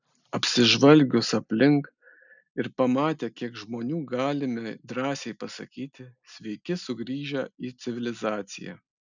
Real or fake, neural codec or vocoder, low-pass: real; none; 7.2 kHz